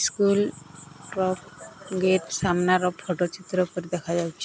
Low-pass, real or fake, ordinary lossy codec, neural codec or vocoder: none; real; none; none